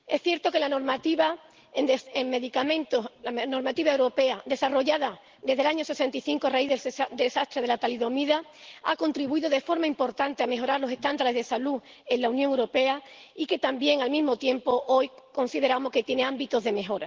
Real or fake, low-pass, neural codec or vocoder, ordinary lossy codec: real; 7.2 kHz; none; Opus, 16 kbps